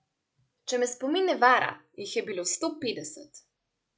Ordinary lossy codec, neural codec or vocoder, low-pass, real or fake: none; none; none; real